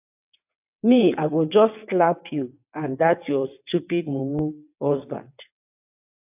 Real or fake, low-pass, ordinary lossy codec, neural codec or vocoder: fake; 3.6 kHz; AAC, 32 kbps; vocoder, 22.05 kHz, 80 mel bands, WaveNeXt